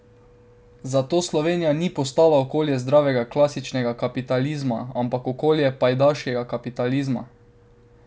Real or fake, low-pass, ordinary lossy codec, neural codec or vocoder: real; none; none; none